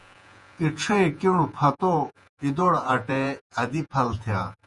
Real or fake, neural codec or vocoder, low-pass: fake; vocoder, 48 kHz, 128 mel bands, Vocos; 10.8 kHz